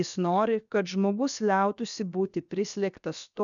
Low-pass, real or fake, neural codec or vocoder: 7.2 kHz; fake; codec, 16 kHz, 0.7 kbps, FocalCodec